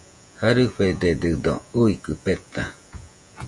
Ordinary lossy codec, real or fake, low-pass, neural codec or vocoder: Opus, 64 kbps; fake; 10.8 kHz; vocoder, 48 kHz, 128 mel bands, Vocos